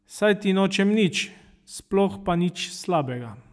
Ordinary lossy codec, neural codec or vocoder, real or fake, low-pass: none; none; real; none